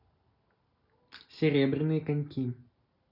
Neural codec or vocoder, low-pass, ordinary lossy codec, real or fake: none; 5.4 kHz; none; real